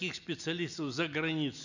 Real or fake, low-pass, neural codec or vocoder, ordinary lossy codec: real; 7.2 kHz; none; none